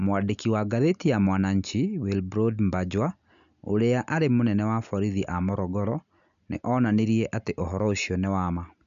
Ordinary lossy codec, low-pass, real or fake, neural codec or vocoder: none; 7.2 kHz; real; none